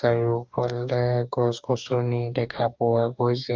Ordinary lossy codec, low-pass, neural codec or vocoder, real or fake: Opus, 32 kbps; 7.2 kHz; codec, 44.1 kHz, 2.6 kbps, DAC; fake